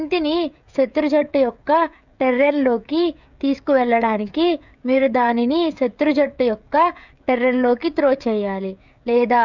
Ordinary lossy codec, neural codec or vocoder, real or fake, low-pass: none; codec, 16 kHz, 16 kbps, FreqCodec, smaller model; fake; 7.2 kHz